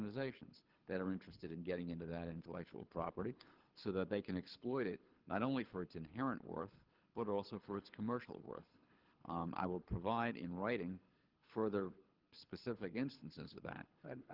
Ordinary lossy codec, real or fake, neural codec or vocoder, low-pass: Opus, 16 kbps; fake; codec, 24 kHz, 6 kbps, HILCodec; 5.4 kHz